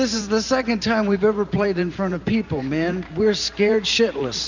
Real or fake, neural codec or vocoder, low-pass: fake; vocoder, 44.1 kHz, 128 mel bands every 512 samples, BigVGAN v2; 7.2 kHz